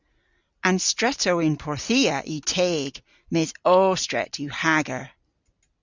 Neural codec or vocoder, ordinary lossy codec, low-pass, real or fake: none; Opus, 64 kbps; 7.2 kHz; real